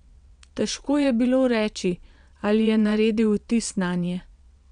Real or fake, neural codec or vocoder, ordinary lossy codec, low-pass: fake; vocoder, 22.05 kHz, 80 mel bands, WaveNeXt; none; 9.9 kHz